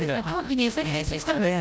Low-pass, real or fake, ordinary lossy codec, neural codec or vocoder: none; fake; none; codec, 16 kHz, 0.5 kbps, FreqCodec, larger model